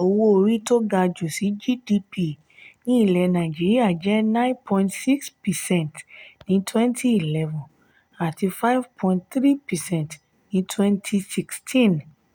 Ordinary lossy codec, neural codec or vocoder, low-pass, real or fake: none; none; none; real